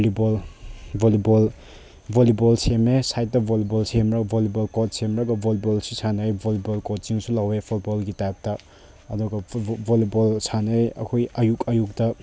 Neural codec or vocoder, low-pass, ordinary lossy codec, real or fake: none; none; none; real